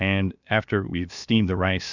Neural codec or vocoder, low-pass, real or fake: codec, 16 kHz, 0.7 kbps, FocalCodec; 7.2 kHz; fake